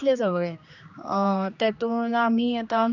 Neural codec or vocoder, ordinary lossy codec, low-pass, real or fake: codec, 16 kHz, 2 kbps, X-Codec, HuBERT features, trained on general audio; none; 7.2 kHz; fake